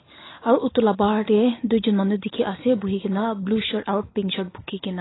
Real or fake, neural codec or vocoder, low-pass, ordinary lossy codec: real; none; 7.2 kHz; AAC, 16 kbps